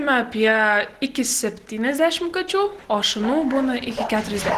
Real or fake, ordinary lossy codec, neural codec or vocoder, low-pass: real; Opus, 16 kbps; none; 14.4 kHz